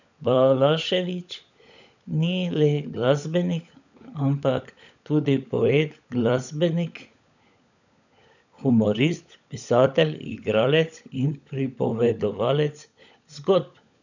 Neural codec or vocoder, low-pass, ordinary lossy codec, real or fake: codec, 16 kHz, 16 kbps, FunCodec, trained on LibriTTS, 50 frames a second; 7.2 kHz; none; fake